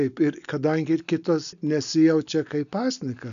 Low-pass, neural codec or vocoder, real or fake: 7.2 kHz; none; real